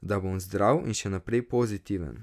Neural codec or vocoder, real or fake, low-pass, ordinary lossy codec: none; real; 14.4 kHz; none